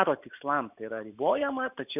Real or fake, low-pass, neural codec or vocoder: real; 3.6 kHz; none